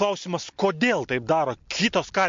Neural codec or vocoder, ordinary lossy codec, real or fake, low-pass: none; MP3, 48 kbps; real; 7.2 kHz